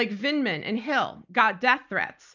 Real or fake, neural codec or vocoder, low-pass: real; none; 7.2 kHz